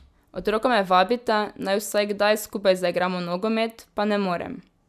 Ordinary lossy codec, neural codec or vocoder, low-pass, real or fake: none; none; 14.4 kHz; real